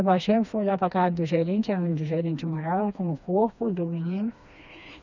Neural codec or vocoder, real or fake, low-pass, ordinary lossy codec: codec, 16 kHz, 2 kbps, FreqCodec, smaller model; fake; 7.2 kHz; none